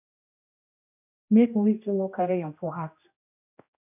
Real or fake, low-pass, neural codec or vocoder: fake; 3.6 kHz; codec, 16 kHz, 1 kbps, X-Codec, HuBERT features, trained on general audio